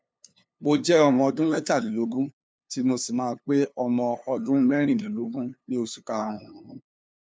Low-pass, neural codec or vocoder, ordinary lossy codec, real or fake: none; codec, 16 kHz, 2 kbps, FunCodec, trained on LibriTTS, 25 frames a second; none; fake